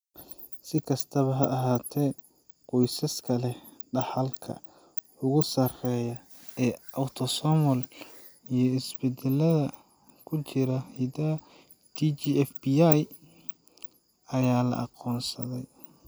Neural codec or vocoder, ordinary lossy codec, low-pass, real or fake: none; none; none; real